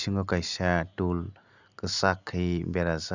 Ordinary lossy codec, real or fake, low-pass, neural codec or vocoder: none; real; 7.2 kHz; none